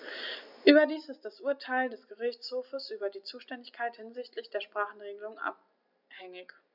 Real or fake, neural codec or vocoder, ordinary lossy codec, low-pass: real; none; none; 5.4 kHz